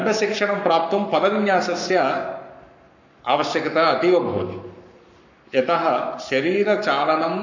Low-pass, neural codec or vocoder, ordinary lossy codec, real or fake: 7.2 kHz; codec, 44.1 kHz, 7.8 kbps, Pupu-Codec; none; fake